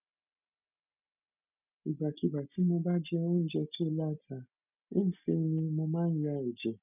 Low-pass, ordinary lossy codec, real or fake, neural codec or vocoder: 3.6 kHz; none; real; none